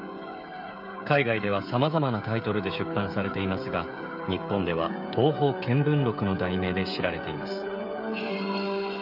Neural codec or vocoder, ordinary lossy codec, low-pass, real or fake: codec, 16 kHz, 16 kbps, FreqCodec, smaller model; none; 5.4 kHz; fake